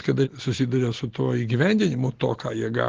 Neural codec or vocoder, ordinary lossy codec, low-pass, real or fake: none; Opus, 16 kbps; 7.2 kHz; real